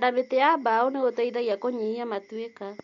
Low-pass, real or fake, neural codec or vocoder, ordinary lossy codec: 7.2 kHz; real; none; MP3, 48 kbps